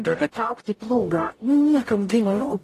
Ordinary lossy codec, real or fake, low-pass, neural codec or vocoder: AAC, 48 kbps; fake; 14.4 kHz; codec, 44.1 kHz, 0.9 kbps, DAC